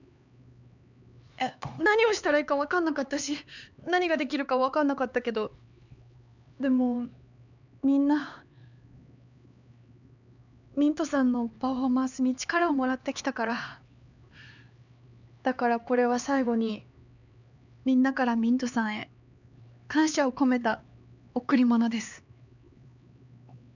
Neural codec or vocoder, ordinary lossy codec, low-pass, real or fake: codec, 16 kHz, 4 kbps, X-Codec, HuBERT features, trained on LibriSpeech; none; 7.2 kHz; fake